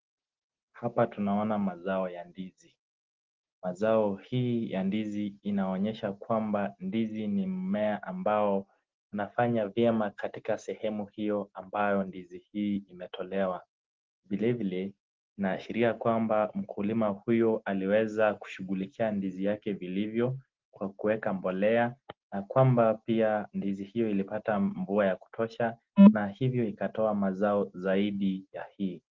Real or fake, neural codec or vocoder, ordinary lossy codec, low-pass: real; none; Opus, 16 kbps; 7.2 kHz